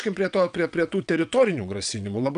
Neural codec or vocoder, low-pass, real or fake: vocoder, 22.05 kHz, 80 mel bands, WaveNeXt; 9.9 kHz; fake